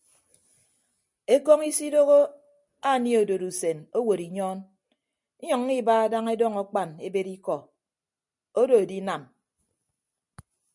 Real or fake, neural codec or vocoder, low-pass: real; none; 10.8 kHz